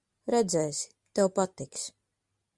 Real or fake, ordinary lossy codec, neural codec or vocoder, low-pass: real; Opus, 64 kbps; none; 10.8 kHz